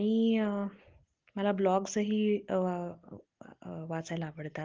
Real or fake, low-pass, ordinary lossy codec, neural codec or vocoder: real; 7.2 kHz; Opus, 16 kbps; none